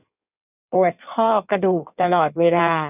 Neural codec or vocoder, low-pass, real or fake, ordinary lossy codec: codec, 16 kHz in and 24 kHz out, 1.1 kbps, FireRedTTS-2 codec; 3.6 kHz; fake; none